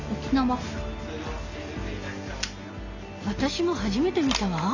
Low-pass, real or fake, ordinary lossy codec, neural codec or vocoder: 7.2 kHz; real; none; none